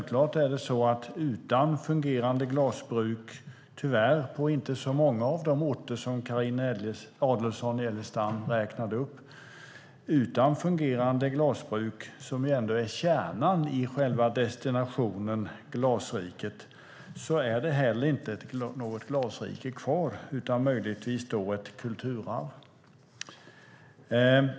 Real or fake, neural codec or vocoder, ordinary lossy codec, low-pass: real; none; none; none